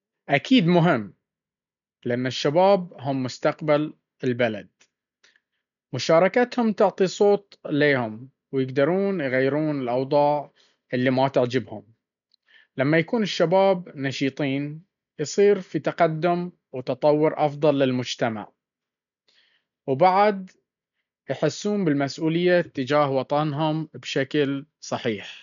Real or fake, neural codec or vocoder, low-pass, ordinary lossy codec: real; none; 7.2 kHz; none